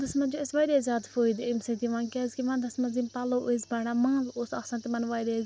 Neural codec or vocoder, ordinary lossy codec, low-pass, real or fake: none; none; none; real